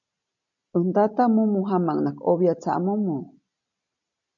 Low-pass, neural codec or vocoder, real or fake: 7.2 kHz; none; real